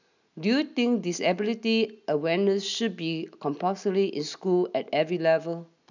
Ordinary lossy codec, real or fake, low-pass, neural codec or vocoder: none; real; 7.2 kHz; none